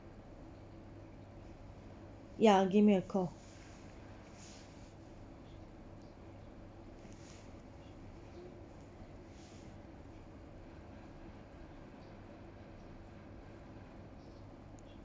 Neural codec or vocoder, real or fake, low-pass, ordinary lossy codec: none; real; none; none